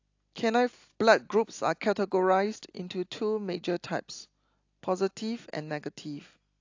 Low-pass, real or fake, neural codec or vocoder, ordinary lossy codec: 7.2 kHz; real; none; AAC, 48 kbps